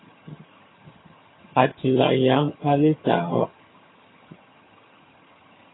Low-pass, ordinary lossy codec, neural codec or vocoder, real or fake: 7.2 kHz; AAC, 16 kbps; vocoder, 22.05 kHz, 80 mel bands, HiFi-GAN; fake